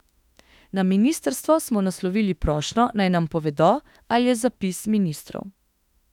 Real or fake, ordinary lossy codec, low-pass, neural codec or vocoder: fake; none; 19.8 kHz; autoencoder, 48 kHz, 32 numbers a frame, DAC-VAE, trained on Japanese speech